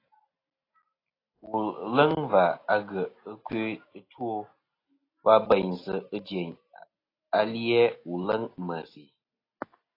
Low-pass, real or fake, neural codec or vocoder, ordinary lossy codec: 5.4 kHz; real; none; AAC, 24 kbps